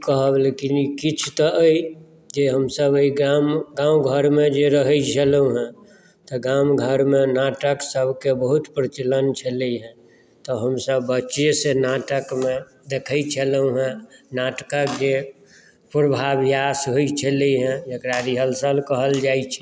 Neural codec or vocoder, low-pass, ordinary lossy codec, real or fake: none; none; none; real